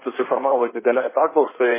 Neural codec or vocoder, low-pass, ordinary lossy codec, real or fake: codec, 16 kHz in and 24 kHz out, 1.1 kbps, FireRedTTS-2 codec; 3.6 kHz; MP3, 16 kbps; fake